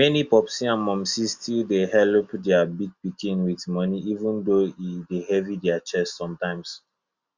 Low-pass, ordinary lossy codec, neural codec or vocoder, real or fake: 7.2 kHz; Opus, 64 kbps; none; real